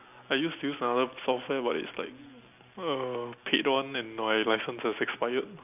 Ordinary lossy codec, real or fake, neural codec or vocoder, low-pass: none; real; none; 3.6 kHz